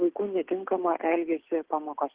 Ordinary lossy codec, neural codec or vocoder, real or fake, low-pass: Opus, 16 kbps; none; real; 3.6 kHz